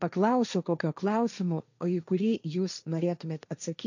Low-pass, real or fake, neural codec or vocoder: 7.2 kHz; fake; codec, 16 kHz, 1.1 kbps, Voila-Tokenizer